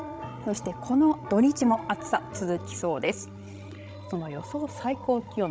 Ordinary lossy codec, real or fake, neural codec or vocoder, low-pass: none; fake; codec, 16 kHz, 16 kbps, FreqCodec, larger model; none